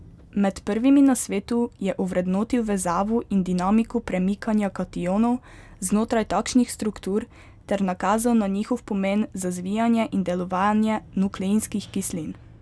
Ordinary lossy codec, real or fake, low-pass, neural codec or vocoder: none; real; none; none